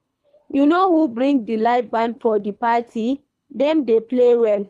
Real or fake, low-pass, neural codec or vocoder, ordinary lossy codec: fake; none; codec, 24 kHz, 3 kbps, HILCodec; none